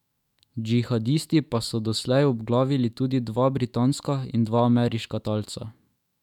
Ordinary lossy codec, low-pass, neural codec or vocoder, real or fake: none; 19.8 kHz; autoencoder, 48 kHz, 128 numbers a frame, DAC-VAE, trained on Japanese speech; fake